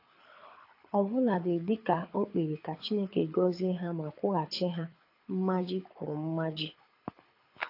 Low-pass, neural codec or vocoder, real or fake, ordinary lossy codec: 5.4 kHz; codec, 24 kHz, 6 kbps, HILCodec; fake; AAC, 32 kbps